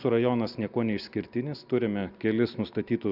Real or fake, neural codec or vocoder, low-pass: real; none; 5.4 kHz